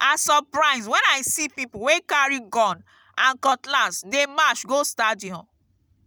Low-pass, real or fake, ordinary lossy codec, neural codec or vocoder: none; real; none; none